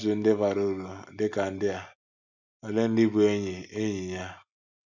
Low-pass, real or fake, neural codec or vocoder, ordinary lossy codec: 7.2 kHz; real; none; none